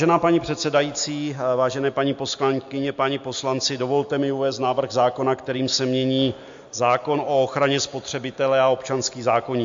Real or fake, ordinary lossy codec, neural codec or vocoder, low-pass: real; MP3, 48 kbps; none; 7.2 kHz